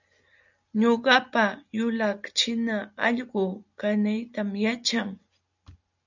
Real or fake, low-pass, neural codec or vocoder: real; 7.2 kHz; none